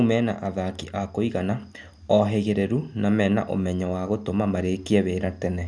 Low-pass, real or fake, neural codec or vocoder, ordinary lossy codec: 9.9 kHz; real; none; none